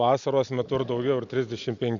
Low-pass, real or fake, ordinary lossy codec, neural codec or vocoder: 7.2 kHz; real; Opus, 64 kbps; none